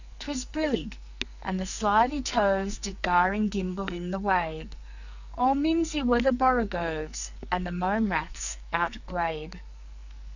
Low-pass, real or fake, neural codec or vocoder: 7.2 kHz; fake; codec, 44.1 kHz, 2.6 kbps, SNAC